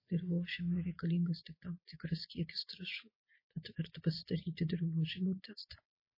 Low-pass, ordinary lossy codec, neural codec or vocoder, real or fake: 5.4 kHz; MP3, 24 kbps; none; real